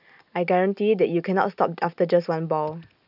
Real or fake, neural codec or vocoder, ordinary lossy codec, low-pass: real; none; none; 5.4 kHz